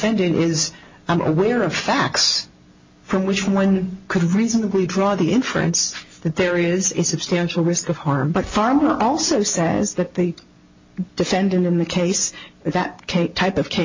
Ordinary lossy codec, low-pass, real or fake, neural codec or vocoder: MP3, 48 kbps; 7.2 kHz; real; none